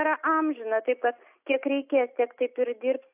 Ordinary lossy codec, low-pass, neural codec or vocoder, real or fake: AAC, 32 kbps; 3.6 kHz; none; real